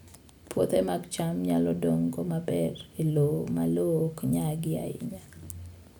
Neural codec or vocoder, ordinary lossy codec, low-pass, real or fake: none; none; none; real